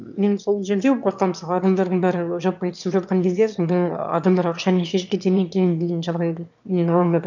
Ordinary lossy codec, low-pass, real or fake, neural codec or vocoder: none; 7.2 kHz; fake; autoencoder, 22.05 kHz, a latent of 192 numbers a frame, VITS, trained on one speaker